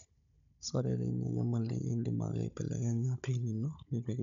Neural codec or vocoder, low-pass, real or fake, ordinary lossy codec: codec, 16 kHz, 16 kbps, FunCodec, trained on Chinese and English, 50 frames a second; 7.2 kHz; fake; none